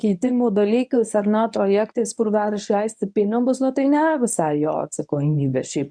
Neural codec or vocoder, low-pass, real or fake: codec, 24 kHz, 0.9 kbps, WavTokenizer, medium speech release version 1; 9.9 kHz; fake